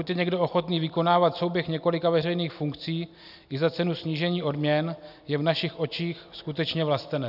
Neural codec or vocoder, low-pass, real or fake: none; 5.4 kHz; real